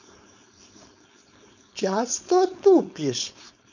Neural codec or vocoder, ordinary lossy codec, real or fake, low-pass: codec, 16 kHz, 4.8 kbps, FACodec; none; fake; 7.2 kHz